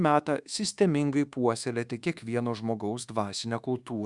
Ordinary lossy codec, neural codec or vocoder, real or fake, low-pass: Opus, 64 kbps; codec, 24 kHz, 1.2 kbps, DualCodec; fake; 10.8 kHz